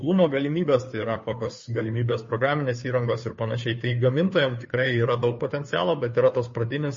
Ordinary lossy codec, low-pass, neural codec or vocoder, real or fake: MP3, 32 kbps; 7.2 kHz; codec, 16 kHz, 2 kbps, FunCodec, trained on Chinese and English, 25 frames a second; fake